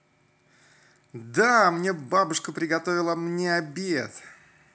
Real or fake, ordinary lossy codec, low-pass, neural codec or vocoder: real; none; none; none